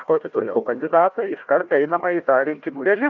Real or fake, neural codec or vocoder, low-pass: fake; codec, 16 kHz, 1 kbps, FunCodec, trained on Chinese and English, 50 frames a second; 7.2 kHz